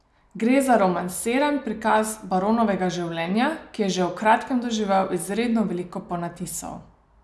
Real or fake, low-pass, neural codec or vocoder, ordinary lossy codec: real; none; none; none